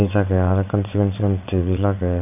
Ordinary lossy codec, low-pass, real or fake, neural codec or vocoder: none; 3.6 kHz; fake; vocoder, 22.05 kHz, 80 mel bands, WaveNeXt